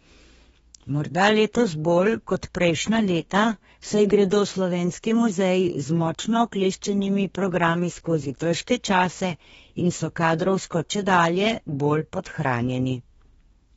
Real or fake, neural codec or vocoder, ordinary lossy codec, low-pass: fake; codec, 32 kHz, 1.9 kbps, SNAC; AAC, 24 kbps; 14.4 kHz